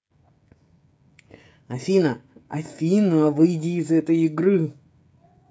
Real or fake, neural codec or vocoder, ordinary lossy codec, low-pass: fake; codec, 16 kHz, 16 kbps, FreqCodec, smaller model; none; none